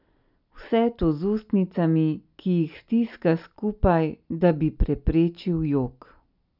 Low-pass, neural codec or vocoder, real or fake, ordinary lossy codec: 5.4 kHz; none; real; none